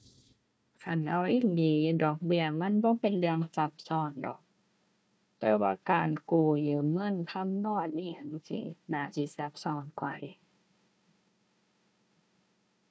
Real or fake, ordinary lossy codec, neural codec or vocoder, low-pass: fake; none; codec, 16 kHz, 1 kbps, FunCodec, trained on Chinese and English, 50 frames a second; none